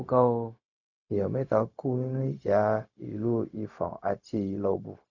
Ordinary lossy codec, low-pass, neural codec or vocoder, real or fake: none; 7.2 kHz; codec, 16 kHz, 0.4 kbps, LongCat-Audio-Codec; fake